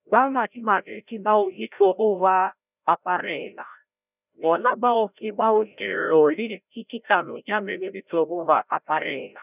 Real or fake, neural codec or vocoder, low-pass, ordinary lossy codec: fake; codec, 16 kHz, 0.5 kbps, FreqCodec, larger model; 3.6 kHz; none